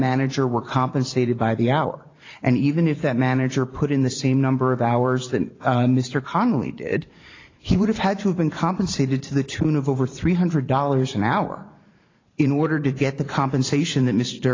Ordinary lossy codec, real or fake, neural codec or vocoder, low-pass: AAC, 32 kbps; fake; vocoder, 44.1 kHz, 128 mel bands every 512 samples, BigVGAN v2; 7.2 kHz